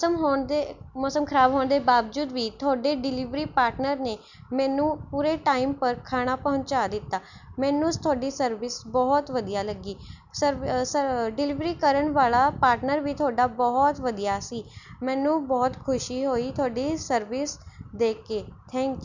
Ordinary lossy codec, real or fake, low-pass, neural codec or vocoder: none; real; 7.2 kHz; none